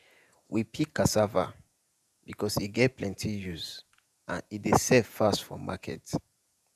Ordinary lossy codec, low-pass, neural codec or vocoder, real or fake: none; 14.4 kHz; vocoder, 48 kHz, 128 mel bands, Vocos; fake